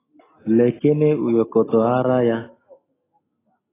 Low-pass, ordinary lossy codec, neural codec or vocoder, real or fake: 3.6 kHz; AAC, 16 kbps; none; real